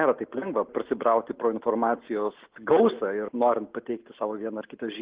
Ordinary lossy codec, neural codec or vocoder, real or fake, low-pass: Opus, 16 kbps; none; real; 3.6 kHz